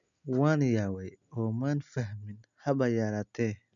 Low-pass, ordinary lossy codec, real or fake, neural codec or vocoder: 7.2 kHz; none; real; none